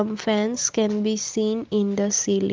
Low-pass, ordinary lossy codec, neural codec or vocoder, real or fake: 7.2 kHz; Opus, 24 kbps; none; real